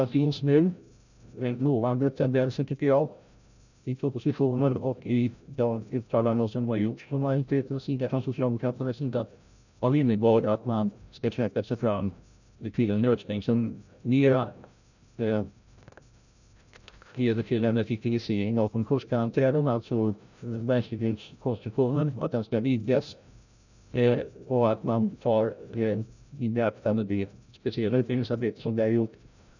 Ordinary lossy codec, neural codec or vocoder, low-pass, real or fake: none; codec, 16 kHz, 0.5 kbps, FreqCodec, larger model; 7.2 kHz; fake